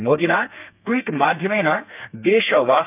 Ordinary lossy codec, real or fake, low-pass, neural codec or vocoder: none; fake; 3.6 kHz; codec, 32 kHz, 1.9 kbps, SNAC